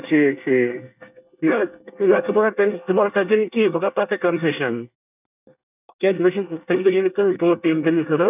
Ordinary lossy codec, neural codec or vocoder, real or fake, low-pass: none; codec, 24 kHz, 1 kbps, SNAC; fake; 3.6 kHz